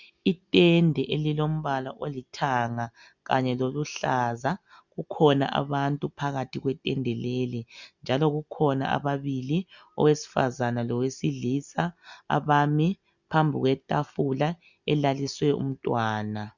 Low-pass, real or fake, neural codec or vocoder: 7.2 kHz; real; none